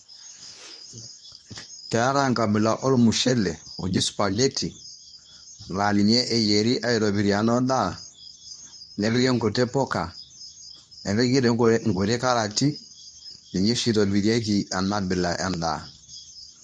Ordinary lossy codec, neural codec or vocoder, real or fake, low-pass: none; codec, 24 kHz, 0.9 kbps, WavTokenizer, medium speech release version 2; fake; none